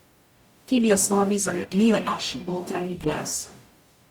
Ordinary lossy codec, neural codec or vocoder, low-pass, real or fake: Opus, 64 kbps; codec, 44.1 kHz, 0.9 kbps, DAC; 19.8 kHz; fake